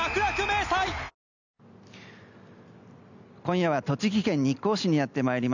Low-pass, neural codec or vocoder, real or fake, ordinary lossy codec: 7.2 kHz; none; real; none